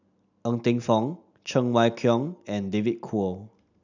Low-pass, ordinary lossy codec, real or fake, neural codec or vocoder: 7.2 kHz; none; real; none